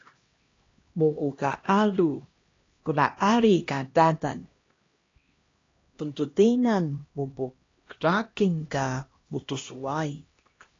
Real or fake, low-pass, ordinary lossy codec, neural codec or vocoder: fake; 7.2 kHz; AAC, 32 kbps; codec, 16 kHz, 1 kbps, X-Codec, HuBERT features, trained on LibriSpeech